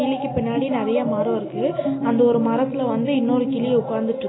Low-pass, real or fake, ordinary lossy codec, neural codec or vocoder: 7.2 kHz; real; AAC, 16 kbps; none